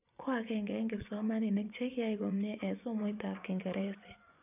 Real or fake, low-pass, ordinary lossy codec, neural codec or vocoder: fake; 3.6 kHz; none; vocoder, 24 kHz, 100 mel bands, Vocos